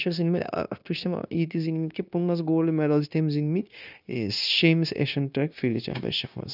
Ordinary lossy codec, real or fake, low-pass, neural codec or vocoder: none; fake; 5.4 kHz; codec, 16 kHz, 0.9 kbps, LongCat-Audio-Codec